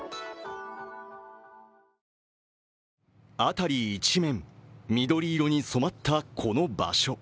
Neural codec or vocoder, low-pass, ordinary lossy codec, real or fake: none; none; none; real